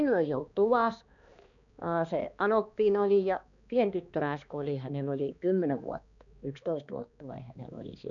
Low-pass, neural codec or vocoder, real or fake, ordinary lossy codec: 7.2 kHz; codec, 16 kHz, 2 kbps, X-Codec, HuBERT features, trained on balanced general audio; fake; none